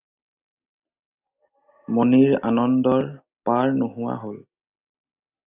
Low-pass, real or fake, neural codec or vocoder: 3.6 kHz; real; none